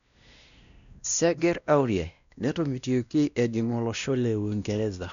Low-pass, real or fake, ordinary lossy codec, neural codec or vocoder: 7.2 kHz; fake; none; codec, 16 kHz, 1 kbps, X-Codec, WavLM features, trained on Multilingual LibriSpeech